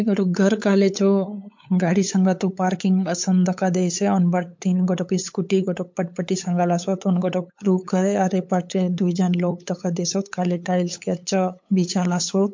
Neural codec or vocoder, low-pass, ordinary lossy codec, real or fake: codec, 16 kHz, 8 kbps, FunCodec, trained on LibriTTS, 25 frames a second; 7.2 kHz; MP3, 48 kbps; fake